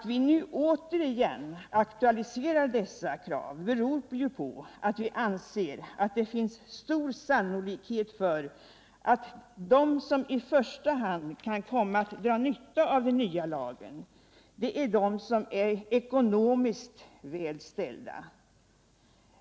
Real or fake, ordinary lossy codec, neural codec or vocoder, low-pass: real; none; none; none